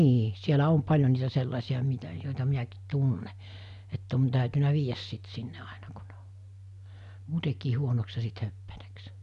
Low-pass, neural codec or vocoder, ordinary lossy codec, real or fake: 10.8 kHz; none; none; real